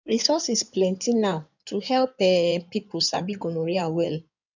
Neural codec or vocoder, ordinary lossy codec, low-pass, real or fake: codec, 16 kHz in and 24 kHz out, 2.2 kbps, FireRedTTS-2 codec; none; 7.2 kHz; fake